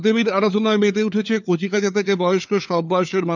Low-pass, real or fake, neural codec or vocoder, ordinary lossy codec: 7.2 kHz; fake; codec, 16 kHz, 4 kbps, FunCodec, trained on LibriTTS, 50 frames a second; none